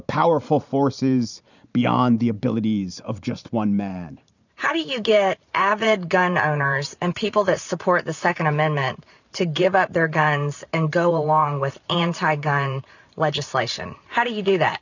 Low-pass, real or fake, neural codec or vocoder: 7.2 kHz; fake; vocoder, 44.1 kHz, 128 mel bands every 512 samples, BigVGAN v2